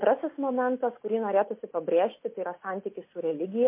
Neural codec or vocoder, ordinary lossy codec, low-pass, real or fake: none; MP3, 24 kbps; 3.6 kHz; real